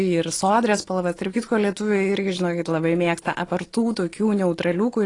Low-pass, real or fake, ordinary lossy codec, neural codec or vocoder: 10.8 kHz; real; AAC, 32 kbps; none